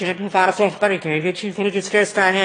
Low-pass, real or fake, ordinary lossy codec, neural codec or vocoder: 9.9 kHz; fake; AAC, 32 kbps; autoencoder, 22.05 kHz, a latent of 192 numbers a frame, VITS, trained on one speaker